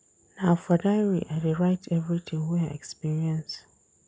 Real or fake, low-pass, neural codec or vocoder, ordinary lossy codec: real; none; none; none